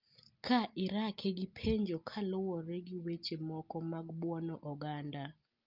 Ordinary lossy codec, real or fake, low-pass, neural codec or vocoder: Opus, 24 kbps; real; 5.4 kHz; none